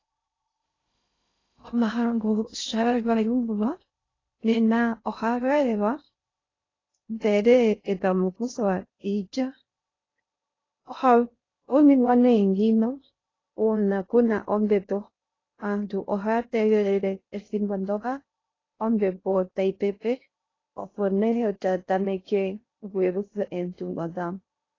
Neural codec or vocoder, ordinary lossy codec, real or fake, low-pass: codec, 16 kHz in and 24 kHz out, 0.6 kbps, FocalCodec, streaming, 2048 codes; AAC, 32 kbps; fake; 7.2 kHz